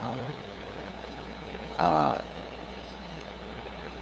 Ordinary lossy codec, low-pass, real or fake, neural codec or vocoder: none; none; fake; codec, 16 kHz, 2 kbps, FunCodec, trained on LibriTTS, 25 frames a second